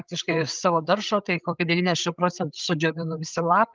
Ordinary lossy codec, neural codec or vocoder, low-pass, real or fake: Opus, 32 kbps; codec, 16 kHz, 8 kbps, FreqCodec, larger model; 7.2 kHz; fake